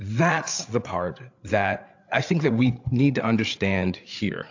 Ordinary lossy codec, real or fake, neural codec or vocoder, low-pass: AAC, 48 kbps; fake; codec, 16 kHz, 8 kbps, FreqCodec, larger model; 7.2 kHz